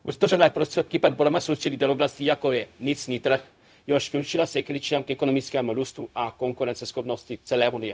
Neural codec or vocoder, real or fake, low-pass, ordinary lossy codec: codec, 16 kHz, 0.4 kbps, LongCat-Audio-Codec; fake; none; none